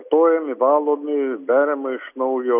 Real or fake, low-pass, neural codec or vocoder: real; 3.6 kHz; none